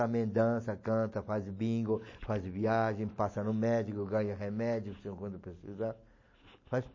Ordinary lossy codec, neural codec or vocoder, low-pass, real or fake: MP3, 32 kbps; none; 7.2 kHz; real